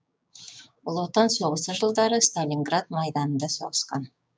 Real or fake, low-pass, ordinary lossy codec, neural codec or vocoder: fake; none; none; codec, 16 kHz, 6 kbps, DAC